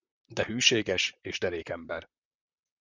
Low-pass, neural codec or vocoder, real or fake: 7.2 kHz; vocoder, 44.1 kHz, 128 mel bands, Pupu-Vocoder; fake